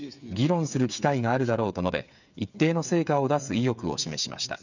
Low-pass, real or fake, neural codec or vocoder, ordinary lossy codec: 7.2 kHz; fake; codec, 16 kHz, 8 kbps, FreqCodec, smaller model; none